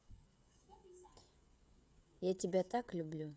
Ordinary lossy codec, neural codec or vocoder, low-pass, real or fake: none; codec, 16 kHz, 16 kbps, FreqCodec, smaller model; none; fake